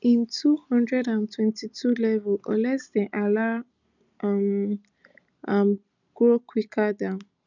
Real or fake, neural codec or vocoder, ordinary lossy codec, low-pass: real; none; none; 7.2 kHz